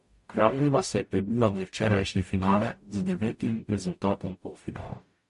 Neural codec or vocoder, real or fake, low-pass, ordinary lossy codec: codec, 44.1 kHz, 0.9 kbps, DAC; fake; 14.4 kHz; MP3, 48 kbps